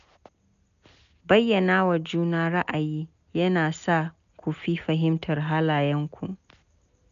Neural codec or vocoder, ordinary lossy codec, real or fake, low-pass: none; none; real; 7.2 kHz